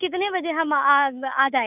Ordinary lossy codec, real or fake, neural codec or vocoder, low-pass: none; fake; codec, 24 kHz, 3.1 kbps, DualCodec; 3.6 kHz